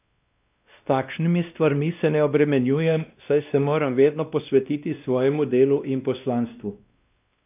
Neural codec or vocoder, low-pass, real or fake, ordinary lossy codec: codec, 16 kHz, 1 kbps, X-Codec, WavLM features, trained on Multilingual LibriSpeech; 3.6 kHz; fake; none